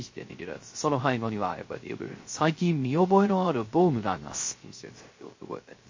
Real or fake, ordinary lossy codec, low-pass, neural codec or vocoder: fake; MP3, 32 kbps; 7.2 kHz; codec, 16 kHz, 0.3 kbps, FocalCodec